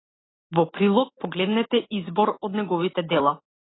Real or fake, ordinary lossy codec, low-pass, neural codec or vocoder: real; AAC, 16 kbps; 7.2 kHz; none